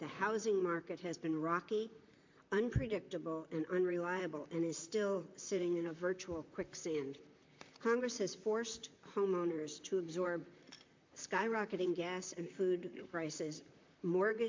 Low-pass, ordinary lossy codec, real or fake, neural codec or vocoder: 7.2 kHz; MP3, 48 kbps; fake; vocoder, 44.1 kHz, 128 mel bands, Pupu-Vocoder